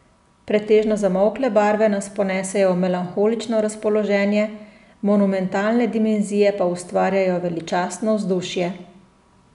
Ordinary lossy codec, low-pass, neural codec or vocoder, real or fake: none; 10.8 kHz; none; real